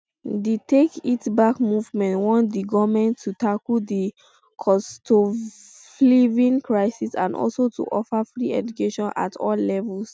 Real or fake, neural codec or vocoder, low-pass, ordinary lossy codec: real; none; none; none